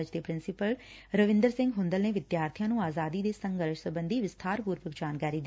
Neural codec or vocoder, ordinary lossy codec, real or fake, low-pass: none; none; real; none